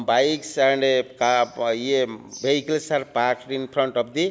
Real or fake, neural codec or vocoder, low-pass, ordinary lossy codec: real; none; none; none